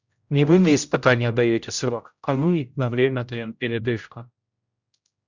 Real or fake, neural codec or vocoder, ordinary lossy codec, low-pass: fake; codec, 16 kHz, 0.5 kbps, X-Codec, HuBERT features, trained on general audio; Opus, 64 kbps; 7.2 kHz